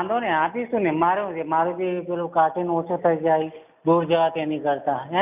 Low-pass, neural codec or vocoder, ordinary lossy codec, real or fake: 3.6 kHz; none; none; real